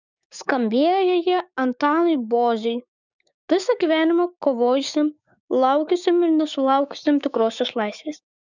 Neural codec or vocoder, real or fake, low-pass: codec, 16 kHz, 6 kbps, DAC; fake; 7.2 kHz